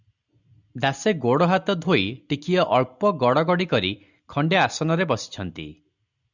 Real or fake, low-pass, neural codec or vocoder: real; 7.2 kHz; none